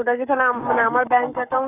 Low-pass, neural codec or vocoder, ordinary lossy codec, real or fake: 3.6 kHz; codec, 44.1 kHz, 7.8 kbps, Pupu-Codec; none; fake